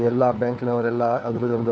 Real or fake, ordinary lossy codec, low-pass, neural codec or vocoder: fake; none; none; codec, 16 kHz, 4 kbps, FunCodec, trained on LibriTTS, 50 frames a second